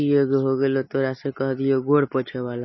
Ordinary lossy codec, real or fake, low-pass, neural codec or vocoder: MP3, 24 kbps; real; 7.2 kHz; none